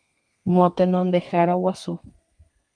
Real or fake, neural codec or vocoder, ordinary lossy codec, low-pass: fake; codec, 32 kHz, 1.9 kbps, SNAC; Opus, 32 kbps; 9.9 kHz